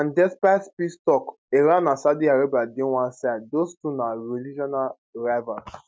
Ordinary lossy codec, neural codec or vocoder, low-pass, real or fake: none; none; none; real